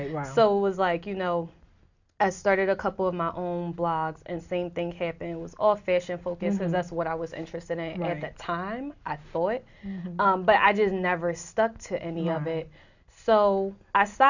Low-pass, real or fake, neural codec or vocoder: 7.2 kHz; real; none